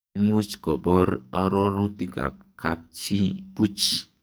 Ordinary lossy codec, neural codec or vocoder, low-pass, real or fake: none; codec, 44.1 kHz, 2.6 kbps, SNAC; none; fake